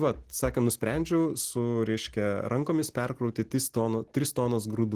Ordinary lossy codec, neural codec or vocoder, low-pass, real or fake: Opus, 16 kbps; none; 14.4 kHz; real